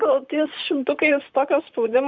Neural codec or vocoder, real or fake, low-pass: vocoder, 44.1 kHz, 128 mel bands, Pupu-Vocoder; fake; 7.2 kHz